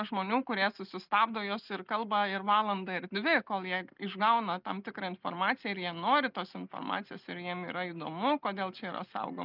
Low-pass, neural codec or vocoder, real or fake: 5.4 kHz; none; real